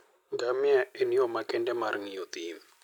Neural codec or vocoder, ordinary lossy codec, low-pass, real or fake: none; none; 19.8 kHz; real